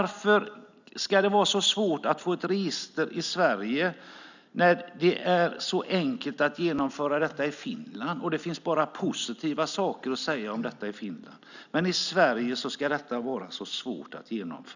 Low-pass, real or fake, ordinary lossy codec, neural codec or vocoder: 7.2 kHz; real; none; none